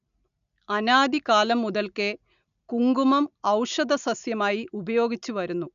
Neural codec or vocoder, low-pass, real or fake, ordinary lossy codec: none; 7.2 kHz; real; none